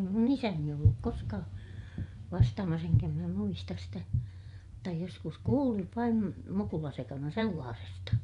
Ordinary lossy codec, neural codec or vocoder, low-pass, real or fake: none; vocoder, 24 kHz, 100 mel bands, Vocos; 10.8 kHz; fake